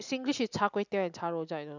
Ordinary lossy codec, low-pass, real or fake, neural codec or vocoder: none; 7.2 kHz; real; none